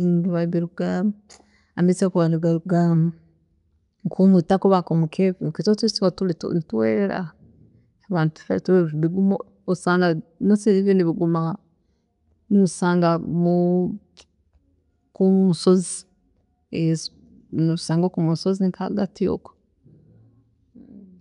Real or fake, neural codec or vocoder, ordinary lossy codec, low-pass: real; none; none; 10.8 kHz